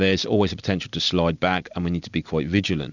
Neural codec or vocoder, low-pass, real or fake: none; 7.2 kHz; real